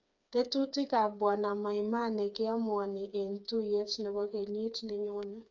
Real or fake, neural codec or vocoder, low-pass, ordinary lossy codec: fake; codec, 16 kHz, 4 kbps, FreqCodec, smaller model; 7.2 kHz; none